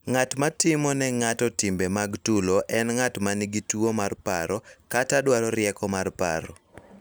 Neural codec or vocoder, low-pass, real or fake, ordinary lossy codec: none; none; real; none